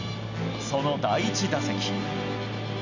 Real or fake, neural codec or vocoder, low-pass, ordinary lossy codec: real; none; 7.2 kHz; none